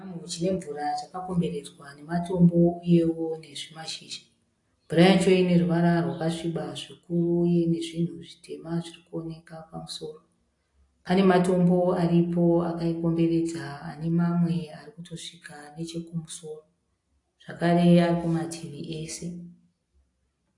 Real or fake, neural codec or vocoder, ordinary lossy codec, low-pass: real; none; AAC, 48 kbps; 10.8 kHz